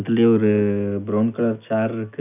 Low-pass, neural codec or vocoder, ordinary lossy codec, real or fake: 3.6 kHz; none; none; real